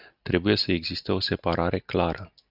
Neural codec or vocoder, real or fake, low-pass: none; real; 5.4 kHz